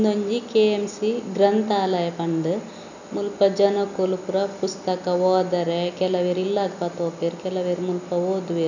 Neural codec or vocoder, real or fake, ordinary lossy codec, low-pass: none; real; none; 7.2 kHz